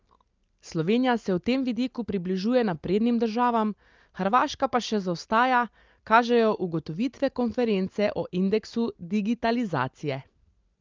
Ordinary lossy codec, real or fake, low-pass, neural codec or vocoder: Opus, 24 kbps; real; 7.2 kHz; none